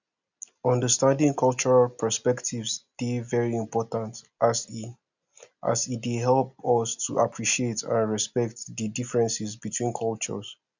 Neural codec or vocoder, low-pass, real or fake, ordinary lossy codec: none; 7.2 kHz; real; none